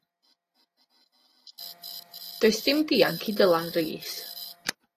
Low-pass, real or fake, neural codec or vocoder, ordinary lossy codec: 14.4 kHz; real; none; AAC, 48 kbps